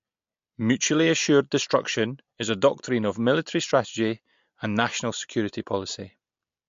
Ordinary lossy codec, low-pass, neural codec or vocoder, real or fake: MP3, 48 kbps; 7.2 kHz; none; real